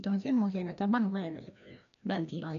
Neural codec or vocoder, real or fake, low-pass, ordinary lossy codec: codec, 16 kHz, 1 kbps, FreqCodec, larger model; fake; 7.2 kHz; none